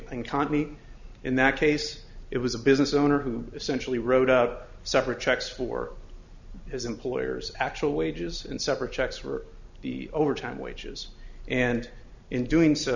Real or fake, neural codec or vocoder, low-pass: real; none; 7.2 kHz